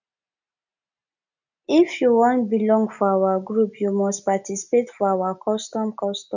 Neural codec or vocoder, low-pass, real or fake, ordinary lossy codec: none; 7.2 kHz; real; none